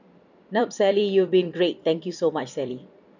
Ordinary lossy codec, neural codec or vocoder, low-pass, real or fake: none; vocoder, 44.1 kHz, 128 mel bands every 256 samples, BigVGAN v2; 7.2 kHz; fake